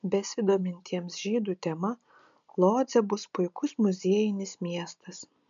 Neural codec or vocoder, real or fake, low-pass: none; real; 7.2 kHz